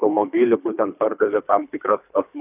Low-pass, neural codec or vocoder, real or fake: 3.6 kHz; codec, 24 kHz, 3 kbps, HILCodec; fake